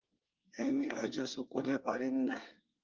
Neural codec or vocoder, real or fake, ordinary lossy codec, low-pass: codec, 24 kHz, 1 kbps, SNAC; fake; Opus, 32 kbps; 7.2 kHz